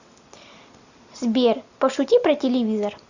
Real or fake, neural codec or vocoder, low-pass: real; none; 7.2 kHz